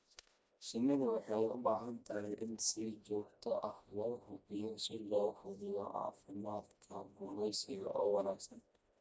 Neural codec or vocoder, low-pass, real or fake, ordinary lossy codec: codec, 16 kHz, 1 kbps, FreqCodec, smaller model; none; fake; none